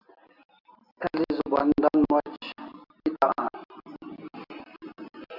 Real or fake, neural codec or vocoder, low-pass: real; none; 5.4 kHz